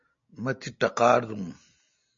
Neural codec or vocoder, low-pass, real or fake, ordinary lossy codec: none; 7.2 kHz; real; MP3, 64 kbps